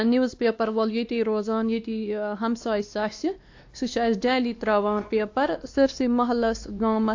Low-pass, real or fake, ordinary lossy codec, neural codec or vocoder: 7.2 kHz; fake; AAC, 48 kbps; codec, 16 kHz, 2 kbps, X-Codec, WavLM features, trained on Multilingual LibriSpeech